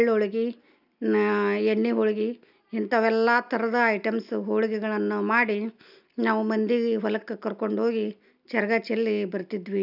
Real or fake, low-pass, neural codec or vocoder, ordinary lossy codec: real; 5.4 kHz; none; none